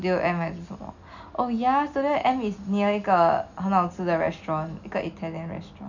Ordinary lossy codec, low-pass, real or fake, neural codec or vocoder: none; 7.2 kHz; real; none